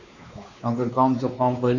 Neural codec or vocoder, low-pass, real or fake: codec, 16 kHz, 2 kbps, X-Codec, WavLM features, trained on Multilingual LibriSpeech; 7.2 kHz; fake